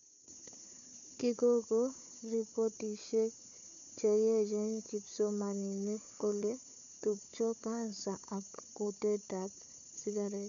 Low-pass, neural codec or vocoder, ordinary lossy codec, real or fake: 7.2 kHz; codec, 16 kHz, 4 kbps, FunCodec, trained on Chinese and English, 50 frames a second; none; fake